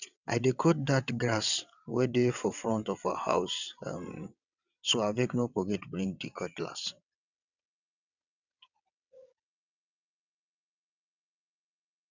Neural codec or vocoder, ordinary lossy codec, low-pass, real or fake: vocoder, 22.05 kHz, 80 mel bands, WaveNeXt; none; 7.2 kHz; fake